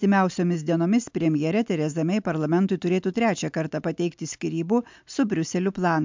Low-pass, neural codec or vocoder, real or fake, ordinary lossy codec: 7.2 kHz; none; real; MP3, 64 kbps